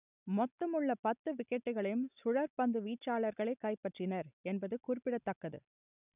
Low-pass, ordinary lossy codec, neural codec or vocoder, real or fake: 3.6 kHz; none; none; real